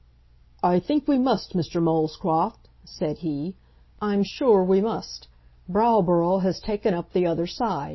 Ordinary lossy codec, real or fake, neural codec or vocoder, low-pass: MP3, 24 kbps; real; none; 7.2 kHz